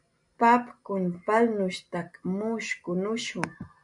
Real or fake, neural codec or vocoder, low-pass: real; none; 10.8 kHz